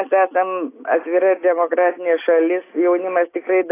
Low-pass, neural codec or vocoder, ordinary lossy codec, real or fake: 3.6 kHz; none; AAC, 24 kbps; real